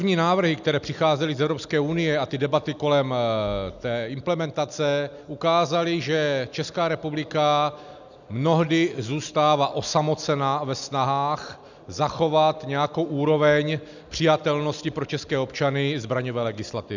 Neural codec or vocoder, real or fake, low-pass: none; real; 7.2 kHz